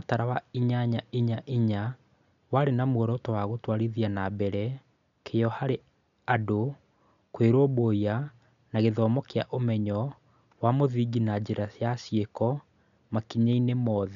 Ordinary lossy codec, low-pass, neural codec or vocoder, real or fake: none; 7.2 kHz; none; real